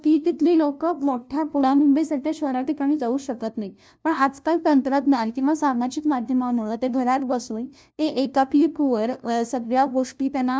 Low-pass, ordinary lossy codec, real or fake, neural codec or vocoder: none; none; fake; codec, 16 kHz, 0.5 kbps, FunCodec, trained on LibriTTS, 25 frames a second